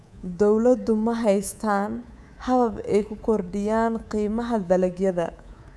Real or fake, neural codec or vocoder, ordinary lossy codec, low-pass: fake; codec, 24 kHz, 3.1 kbps, DualCodec; none; none